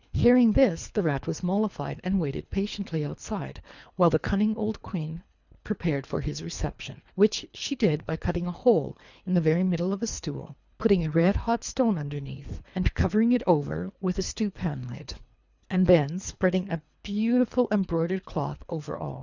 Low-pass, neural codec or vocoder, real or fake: 7.2 kHz; codec, 24 kHz, 3 kbps, HILCodec; fake